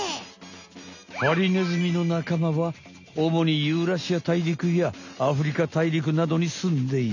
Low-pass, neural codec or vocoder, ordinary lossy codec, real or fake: 7.2 kHz; none; none; real